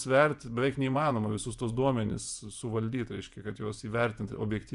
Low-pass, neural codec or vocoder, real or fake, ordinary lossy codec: 10.8 kHz; vocoder, 24 kHz, 100 mel bands, Vocos; fake; Opus, 64 kbps